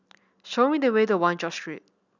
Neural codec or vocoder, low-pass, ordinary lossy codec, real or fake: none; 7.2 kHz; none; real